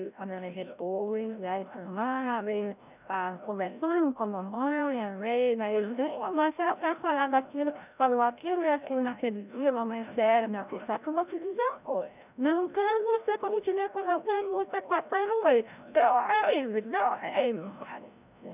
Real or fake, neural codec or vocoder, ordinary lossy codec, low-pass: fake; codec, 16 kHz, 0.5 kbps, FreqCodec, larger model; none; 3.6 kHz